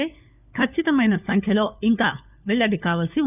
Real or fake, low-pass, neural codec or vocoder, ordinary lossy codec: fake; 3.6 kHz; codec, 16 kHz, 4 kbps, FunCodec, trained on LibriTTS, 50 frames a second; none